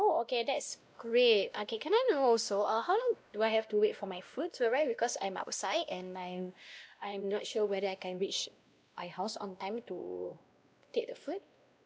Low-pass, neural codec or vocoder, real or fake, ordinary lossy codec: none; codec, 16 kHz, 1 kbps, X-Codec, WavLM features, trained on Multilingual LibriSpeech; fake; none